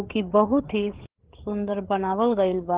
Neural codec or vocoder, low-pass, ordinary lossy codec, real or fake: codec, 16 kHz, 4 kbps, FreqCodec, larger model; 3.6 kHz; Opus, 16 kbps; fake